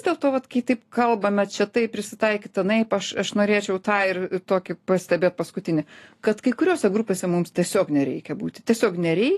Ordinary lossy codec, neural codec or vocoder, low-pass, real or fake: AAC, 48 kbps; none; 14.4 kHz; real